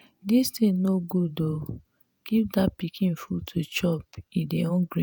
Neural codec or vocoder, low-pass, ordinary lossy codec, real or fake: vocoder, 48 kHz, 128 mel bands, Vocos; none; none; fake